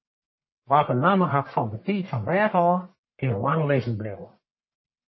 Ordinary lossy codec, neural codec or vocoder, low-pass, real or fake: MP3, 24 kbps; codec, 44.1 kHz, 1.7 kbps, Pupu-Codec; 7.2 kHz; fake